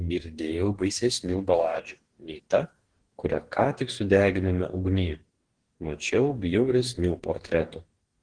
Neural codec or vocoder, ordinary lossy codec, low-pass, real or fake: codec, 44.1 kHz, 2.6 kbps, DAC; Opus, 16 kbps; 9.9 kHz; fake